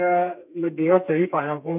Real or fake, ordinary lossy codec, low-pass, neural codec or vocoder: fake; none; 3.6 kHz; codec, 32 kHz, 1.9 kbps, SNAC